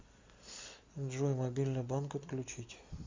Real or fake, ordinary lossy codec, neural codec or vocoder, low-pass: real; MP3, 64 kbps; none; 7.2 kHz